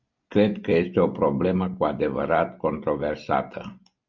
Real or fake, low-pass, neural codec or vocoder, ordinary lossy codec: real; 7.2 kHz; none; MP3, 64 kbps